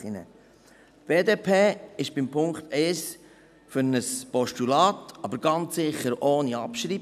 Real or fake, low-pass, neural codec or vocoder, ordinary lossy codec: real; 14.4 kHz; none; none